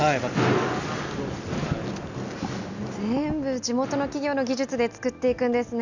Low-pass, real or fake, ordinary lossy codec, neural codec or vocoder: 7.2 kHz; real; none; none